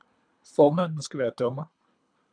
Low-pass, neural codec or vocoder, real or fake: 9.9 kHz; codec, 24 kHz, 3 kbps, HILCodec; fake